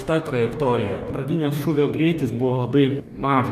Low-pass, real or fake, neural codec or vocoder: 14.4 kHz; fake; codec, 44.1 kHz, 2.6 kbps, DAC